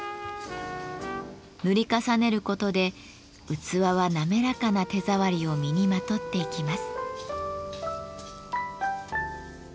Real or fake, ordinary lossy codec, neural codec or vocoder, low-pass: real; none; none; none